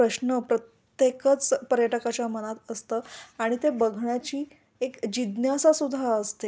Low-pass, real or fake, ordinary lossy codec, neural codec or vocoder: none; real; none; none